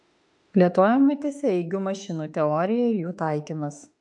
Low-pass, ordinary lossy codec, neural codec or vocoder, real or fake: 10.8 kHz; AAC, 64 kbps; autoencoder, 48 kHz, 32 numbers a frame, DAC-VAE, trained on Japanese speech; fake